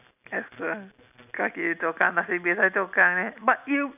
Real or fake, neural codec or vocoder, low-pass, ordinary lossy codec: real; none; 3.6 kHz; none